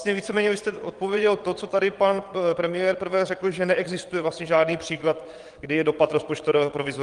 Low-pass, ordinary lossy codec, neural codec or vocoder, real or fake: 9.9 kHz; Opus, 24 kbps; vocoder, 22.05 kHz, 80 mel bands, WaveNeXt; fake